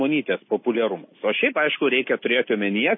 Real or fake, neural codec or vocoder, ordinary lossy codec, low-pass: real; none; MP3, 24 kbps; 7.2 kHz